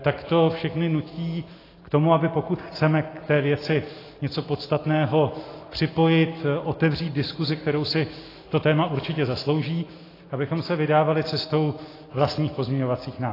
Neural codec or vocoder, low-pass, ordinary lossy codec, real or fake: none; 5.4 kHz; AAC, 24 kbps; real